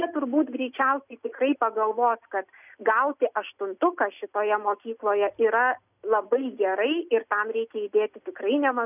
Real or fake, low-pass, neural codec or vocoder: real; 3.6 kHz; none